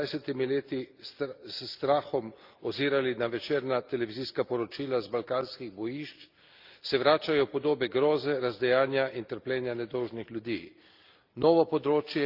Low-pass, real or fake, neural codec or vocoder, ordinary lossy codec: 5.4 kHz; real; none; Opus, 24 kbps